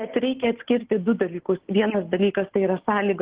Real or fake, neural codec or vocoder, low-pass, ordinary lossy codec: real; none; 3.6 kHz; Opus, 16 kbps